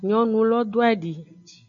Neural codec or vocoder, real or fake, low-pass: none; real; 7.2 kHz